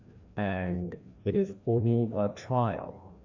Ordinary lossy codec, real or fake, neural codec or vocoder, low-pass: AAC, 48 kbps; fake; codec, 16 kHz, 1 kbps, FreqCodec, larger model; 7.2 kHz